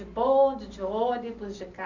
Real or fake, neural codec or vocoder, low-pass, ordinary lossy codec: real; none; 7.2 kHz; none